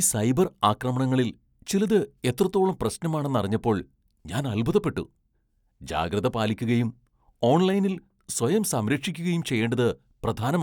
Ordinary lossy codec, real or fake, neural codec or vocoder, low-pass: none; real; none; 19.8 kHz